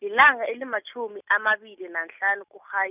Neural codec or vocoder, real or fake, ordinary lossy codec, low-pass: none; real; none; 3.6 kHz